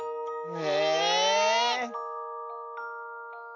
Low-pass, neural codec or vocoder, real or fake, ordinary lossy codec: 7.2 kHz; none; real; none